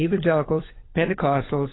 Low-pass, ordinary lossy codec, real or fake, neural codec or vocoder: 7.2 kHz; AAC, 16 kbps; fake; codec, 16 kHz, 16 kbps, FunCodec, trained on LibriTTS, 50 frames a second